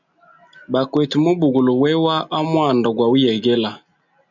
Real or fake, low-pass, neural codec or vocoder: real; 7.2 kHz; none